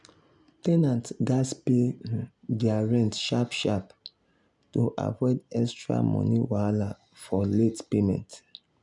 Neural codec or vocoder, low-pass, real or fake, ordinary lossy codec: none; 10.8 kHz; real; none